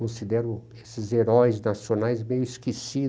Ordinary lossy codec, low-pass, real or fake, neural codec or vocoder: none; none; real; none